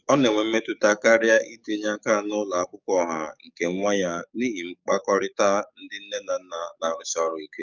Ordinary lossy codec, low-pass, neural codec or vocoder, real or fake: none; 7.2 kHz; codec, 44.1 kHz, 7.8 kbps, DAC; fake